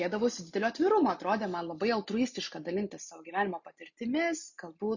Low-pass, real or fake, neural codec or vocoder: 7.2 kHz; real; none